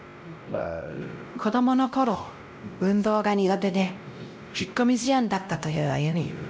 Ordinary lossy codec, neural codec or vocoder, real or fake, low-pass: none; codec, 16 kHz, 0.5 kbps, X-Codec, WavLM features, trained on Multilingual LibriSpeech; fake; none